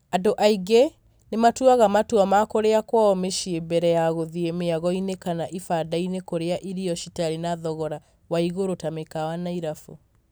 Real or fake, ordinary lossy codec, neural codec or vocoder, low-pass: real; none; none; none